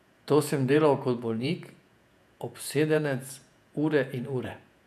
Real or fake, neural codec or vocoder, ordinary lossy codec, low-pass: fake; vocoder, 48 kHz, 128 mel bands, Vocos; none; 14.4 kHz